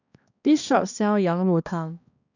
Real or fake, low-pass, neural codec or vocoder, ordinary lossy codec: fake; 7.2 kHz; codec, 16 kHz, 1 kbps, X-Codec, HuBERT features, trained on balanced general audio; none